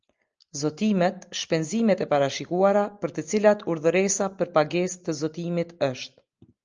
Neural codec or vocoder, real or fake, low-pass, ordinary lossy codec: none; real; 7.2 kHz; Opus, 24 kbps